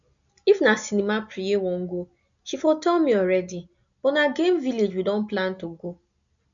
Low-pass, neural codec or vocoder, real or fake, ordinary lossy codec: 7.2 kHz; none; real; none